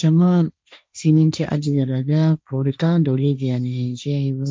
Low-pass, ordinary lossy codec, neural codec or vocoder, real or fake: none; none; codec, 16 kHz, 1.1 kbps, Voila-Tokenizer; fake